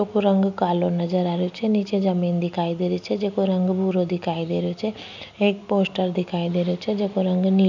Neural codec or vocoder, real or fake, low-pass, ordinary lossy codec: none; real; 7.2 kHz; none